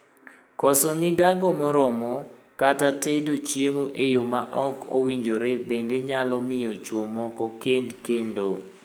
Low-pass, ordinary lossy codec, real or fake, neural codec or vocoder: none; none; fake; codec, 44.1 kHz, 2.6 kbps, SNAC